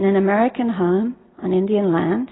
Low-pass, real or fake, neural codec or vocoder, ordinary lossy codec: 7.2 kHz; real; none; AAC, 16 kbps